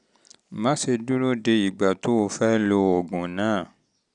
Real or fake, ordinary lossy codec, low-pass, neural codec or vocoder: real; none; 9.9 kHz; none